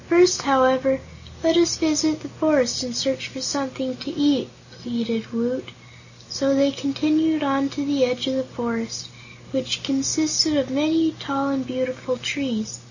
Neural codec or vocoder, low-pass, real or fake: none; 7.2 kHz; real